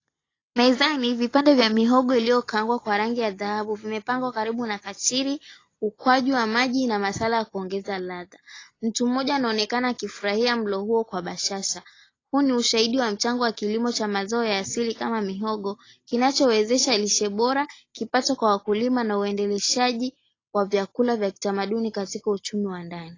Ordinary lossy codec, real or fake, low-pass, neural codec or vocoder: AAC, 32 kbps; real; 7.2 kHz; none